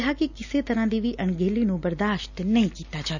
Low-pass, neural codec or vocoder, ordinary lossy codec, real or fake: 7.2 kHz; none; none; real